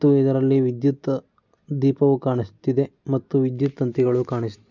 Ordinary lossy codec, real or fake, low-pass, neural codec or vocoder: none; real; 7.2 kHz; none